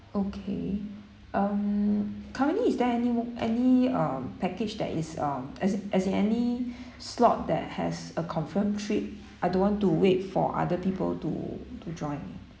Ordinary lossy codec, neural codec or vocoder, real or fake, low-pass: none; none; real; none